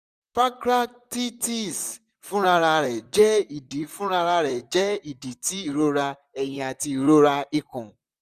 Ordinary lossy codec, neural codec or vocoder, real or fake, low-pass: none; vocoder, 44.1 kHz, 128 mel bands, Pupu-Vocoder; fake; 14.4 kHz